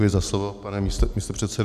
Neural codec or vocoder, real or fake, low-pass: none; real; 14.4 kHz